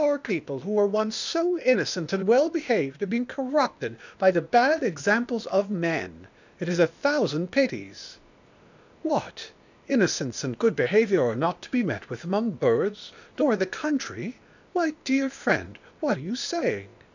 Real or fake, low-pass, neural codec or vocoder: fake; 7.2 kHz; codec, 16 kHz, 0.8 kbps, ZipCodec